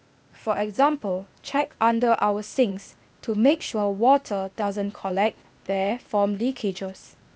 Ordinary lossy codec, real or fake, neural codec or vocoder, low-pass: none; fake; codec, 16 kHz, 0.8 kbps, ZipCodec; none